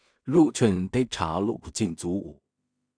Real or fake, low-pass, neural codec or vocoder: fake; 9.9 kHz; codec, 16 kHz in and 24 kHz out, 0.4 kbps, LongCat-Audio-Codec, two codebook decoder